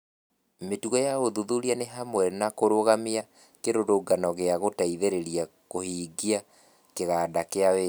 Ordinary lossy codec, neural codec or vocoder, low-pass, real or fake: none; none; none; real